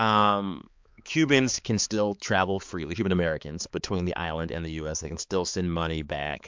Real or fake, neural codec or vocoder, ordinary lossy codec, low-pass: fake; codec, 16 kHz, 4 kbps, X-Codec, HuBERT features, trained on balanced general audio; MP3, 64 kbps; 7.2 kHz